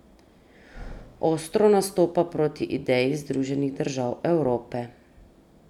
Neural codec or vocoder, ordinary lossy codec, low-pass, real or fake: none; none; 19.8 kHz; real